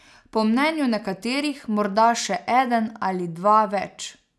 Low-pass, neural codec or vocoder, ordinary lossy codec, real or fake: none; none; none; real